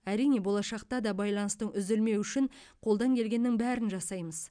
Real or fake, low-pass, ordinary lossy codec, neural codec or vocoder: real; 9.9 kHz; none; none